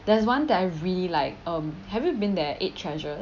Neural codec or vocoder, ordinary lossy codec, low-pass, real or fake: none; none; 7.2 kHz; real